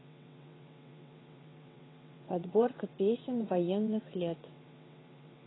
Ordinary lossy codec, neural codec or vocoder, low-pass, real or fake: AAC, 16 kbps; vocoder, 22.05 kHz, 80 mel bands, WaveNeXt; 7.2 kHz; fake